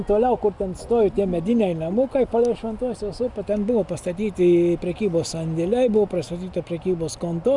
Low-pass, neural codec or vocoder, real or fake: 10.8 kHz; none; real